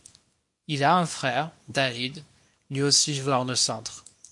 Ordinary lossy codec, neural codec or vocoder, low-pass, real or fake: MP3, 48 kbps; codec, 24 kHz, 0.9 kbps, WavTokenizer, small release; 10.8 kHz; fake